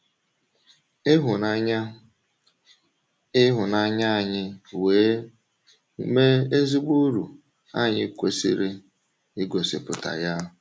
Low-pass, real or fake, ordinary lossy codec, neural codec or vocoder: none; real; none; none